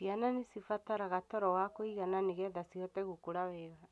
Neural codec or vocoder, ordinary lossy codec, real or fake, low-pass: none; none; real; none